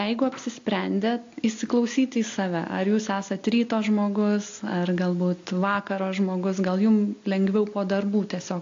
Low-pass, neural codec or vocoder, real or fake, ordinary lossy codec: 7.2 kHz; none; real; AAC, 48 kbps